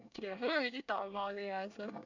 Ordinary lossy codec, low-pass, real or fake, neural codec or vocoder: none; 7.2 kHz; fake; codec, 24 kHz, 1 kbps, SNAC